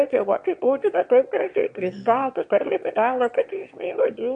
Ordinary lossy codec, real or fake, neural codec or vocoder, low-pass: MP3, 48 kbps; fake; autoencoder, 22.05 kHz, a latent of 192 numbers a frame, VITS, trained on one speaker; 9.9 kHz